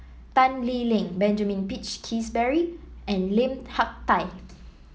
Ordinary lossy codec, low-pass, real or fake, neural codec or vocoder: none; none; real; none